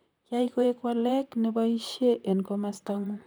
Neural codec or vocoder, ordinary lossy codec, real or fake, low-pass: vocoder, 44.1 kHz, 128 mel bands, Pupu-Vocoder; none; fake; none